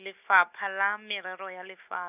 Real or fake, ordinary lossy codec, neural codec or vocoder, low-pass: real; none; none; 3.6 kHz